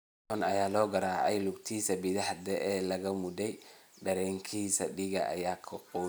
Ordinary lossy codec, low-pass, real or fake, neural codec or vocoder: none; none; real; none